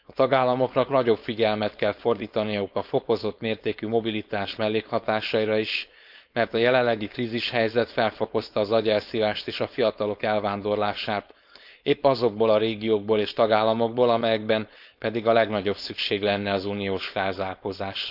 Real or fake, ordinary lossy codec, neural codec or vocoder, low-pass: fake; none; codec, 16 kHz, 4.8 kbps, FACodec; 5.4 kHz